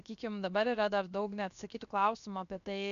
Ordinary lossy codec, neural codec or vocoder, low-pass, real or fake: MP3, 96 kbps; codec, 16 kHz, 0.7 kbps, FocalCodec; 7.2 kHz; fake